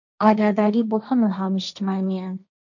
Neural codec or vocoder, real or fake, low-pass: codec, 16 kHz, 1.1 kbps, Voila-Tokenizer; fake; 7.2 kHz